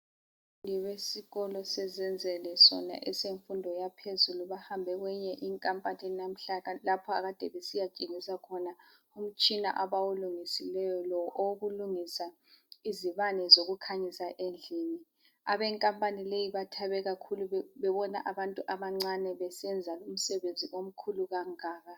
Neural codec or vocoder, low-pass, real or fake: none; 19.8 kHz; real